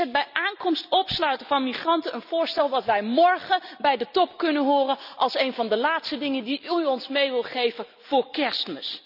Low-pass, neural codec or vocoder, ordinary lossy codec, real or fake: 5.4 kHz; none; none; real